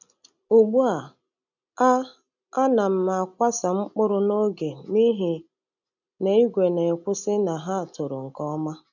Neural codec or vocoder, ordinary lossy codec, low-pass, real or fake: none; none; 7.2 kHz; real